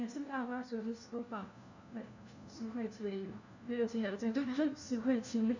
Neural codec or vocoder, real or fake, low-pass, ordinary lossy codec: codec, 16 kHz, 1 kbps, FunCodec, trained on LibriTTS, 50 frames a second; fake; 7.2 kHz; none